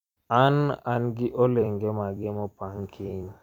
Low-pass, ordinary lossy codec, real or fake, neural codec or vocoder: 19.8 kHz; none; fake; vocoder, 44.1 kHz, 128 mel bands every 256 samples, BigVGAN v2